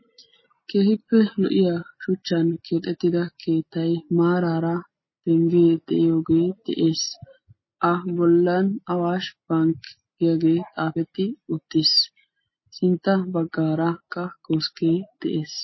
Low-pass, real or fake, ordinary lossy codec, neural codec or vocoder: 7.2 kHz; real; MP3, 24 kbps; none